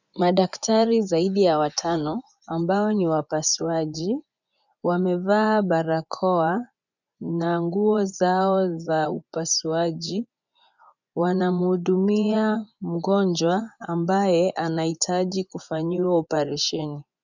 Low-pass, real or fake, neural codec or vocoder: 7.2 kHz; fake; vocoder, 24 kHz, 100 mel bands, Vocos